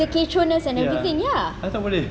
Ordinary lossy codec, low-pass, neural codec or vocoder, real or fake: none; none; none; real